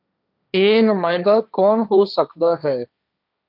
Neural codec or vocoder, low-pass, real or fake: codec, 16 kHz, 1.1 kbps, Voila-Tokenizer; 5.4 kHz; fake